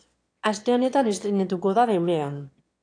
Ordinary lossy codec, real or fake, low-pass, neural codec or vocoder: AAC, 48 kbps; fake; 9.9 kHz; autoencoder, 22.05 kHz, a latent of 192 numbers a frame, VITS, trained on one speaker